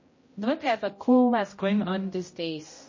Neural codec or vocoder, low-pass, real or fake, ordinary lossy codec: codec, 16 kHz, 0.5 kbps, X-Codec, HuBERT features, trained on general audio; 7.2 kHz; fake; MP3, 32 kbps